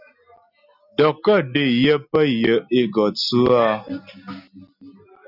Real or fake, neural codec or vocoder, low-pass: real; none; 5.4 kHz